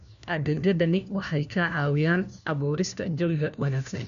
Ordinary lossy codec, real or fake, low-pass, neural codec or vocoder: none; fake; 7.2 kHz; codec, 16 kHz, 1 kbps, FunCodec, trained on LibriTTS, 50 frames a second